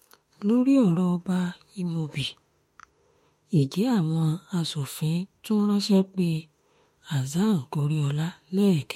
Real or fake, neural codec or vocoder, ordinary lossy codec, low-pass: fake; autoencoder, 48 kHz, 32 numbers a frame, DAC-VAE, trained on Japanese speech; MP3, 64 kbps; 19.8 kHz